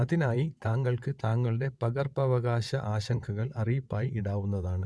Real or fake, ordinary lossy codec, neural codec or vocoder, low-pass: fake; none; vocoder, 22.05 kHz, 80 mel bands, WaveNeXt; none